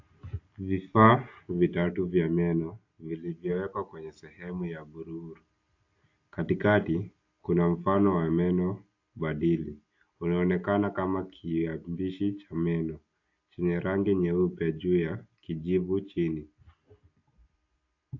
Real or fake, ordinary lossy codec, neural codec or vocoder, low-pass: real; AAC, 48 kbps; none; 7.2 kHz